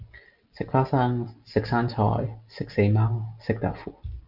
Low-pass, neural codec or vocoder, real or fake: 5.4 kHz; none; real